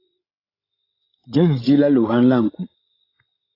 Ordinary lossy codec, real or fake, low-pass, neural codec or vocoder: AAC, 24 kbps; fake; 5.4 kHz; codec, 16 kHz, 16 kbps, FreqCodec, larger model